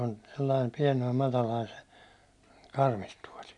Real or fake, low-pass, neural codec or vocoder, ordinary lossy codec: real; 10.8 kHz; none; none